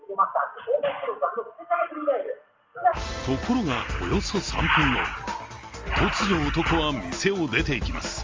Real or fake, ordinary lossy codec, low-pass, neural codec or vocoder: real; Opus, 32 kbps; 7.2 kHz; none